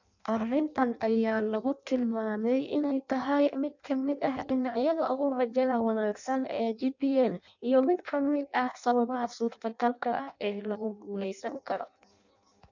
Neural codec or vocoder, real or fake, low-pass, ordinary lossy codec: codec, 16 kHz in and 24 kHz out, 0.6 kbps, FireRedTTS-2 codec; fake; 7.2 kHz; none